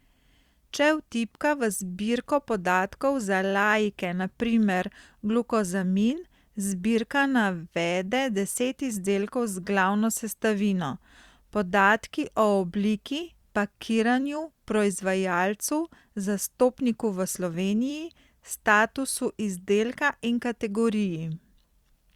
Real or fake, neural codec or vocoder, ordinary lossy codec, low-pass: fake; vocoder, 44.1 kHz, 128 mel bands every 256 samples, BigVGAN v2; Opus, 64 kbps; 19.8 kHz